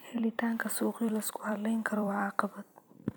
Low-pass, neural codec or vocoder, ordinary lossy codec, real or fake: none; vocoder, 44.1 kHz, 128 mel bands every 256 samples, BigVGAN v2; none; fake